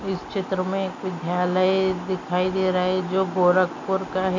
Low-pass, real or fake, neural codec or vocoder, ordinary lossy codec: 7.2 kHz; real; none; MP3, 64 kbps